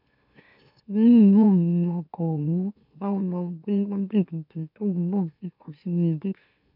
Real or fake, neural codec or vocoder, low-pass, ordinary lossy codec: fake; autoencoder, 44.1 kHz, a latent of 192 numbers a frame, MeloTTS; 5.4 kHz; none